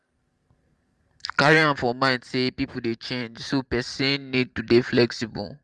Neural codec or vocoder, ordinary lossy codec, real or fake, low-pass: vocoder, 44.1 kHz, 128 mel bands every 512 samples, BigVGAN v2; Opus, 32 kbps; fake; 10.8 kHz